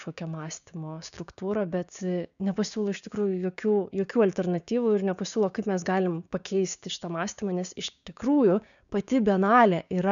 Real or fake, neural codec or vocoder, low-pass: fake; codec, 16 kHz, 6 kbps, DAC; 7.2 kHz